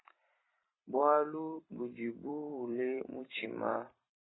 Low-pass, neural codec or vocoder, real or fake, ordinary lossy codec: 7.2 kHz; vocoder, 44.1 kHz, 128 mel bands every 256 samples, BigVGAN v2; fake; AAC, 16 kbps